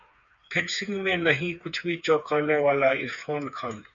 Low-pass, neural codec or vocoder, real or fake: 7.2 kHz; codec, 16 kHz, 4 kbps, FreqCodec, smaller model; fake